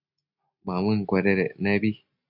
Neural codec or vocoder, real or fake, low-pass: none; real; 5.4 kHz